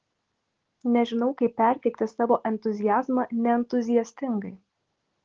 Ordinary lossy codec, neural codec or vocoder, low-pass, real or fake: Opus, 16 kbps; none; 7.2 kHz; real